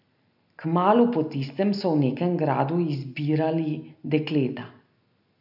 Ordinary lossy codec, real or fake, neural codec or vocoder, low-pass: none; real; none; 5.4 kHz